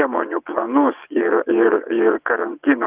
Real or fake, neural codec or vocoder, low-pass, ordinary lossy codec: fake; vocoder, 22.05 kHz, 80 mel bands, WaveNeXt; 3.6 kHz; Opus, 32 kbps